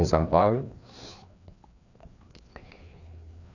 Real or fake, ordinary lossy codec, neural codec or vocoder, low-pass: fake; none; codec, 16 kHz, 2 kbps, FreqCodec, larger model; 7.2 kHz